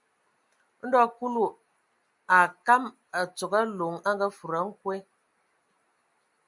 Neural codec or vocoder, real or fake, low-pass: none; real; 10.8 kHz